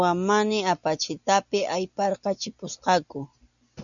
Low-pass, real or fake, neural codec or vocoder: 7.2 kHz; real; none